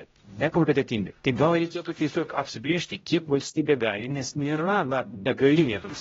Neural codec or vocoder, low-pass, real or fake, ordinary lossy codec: codec, 16 kHz, 0.5 kbps, X-Codec, HuBERT features, trained on general audio; 7.2 kHz; fake; AAC, 24 kbps